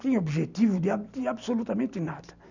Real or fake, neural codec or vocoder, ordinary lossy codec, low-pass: real; none; none; 7.2 kHz